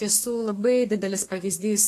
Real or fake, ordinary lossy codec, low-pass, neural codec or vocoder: fake; AAC, 48 kbps; 14.4 kHz; codec, 32 kHz, 1.9 kbps, SNAC